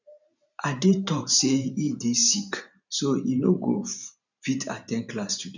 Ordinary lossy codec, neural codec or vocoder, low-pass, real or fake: none; vocoder, 44.1 kHz, 128 mel bands every 512 samples, BigVGAN v2; 7.2 kHz; fake